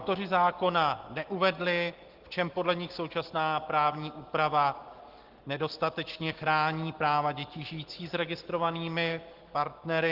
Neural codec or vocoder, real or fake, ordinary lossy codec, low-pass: none; real; Opus, 16 kbps; 5.4 kHz